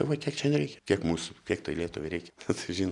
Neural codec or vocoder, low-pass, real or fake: none; 10.8 kHz; real